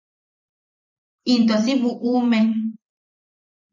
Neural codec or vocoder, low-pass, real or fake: vocoder, 44.1 kHz, 128 mel bands every 512 samples, BigVGAN v2; 7.2 kHz; fake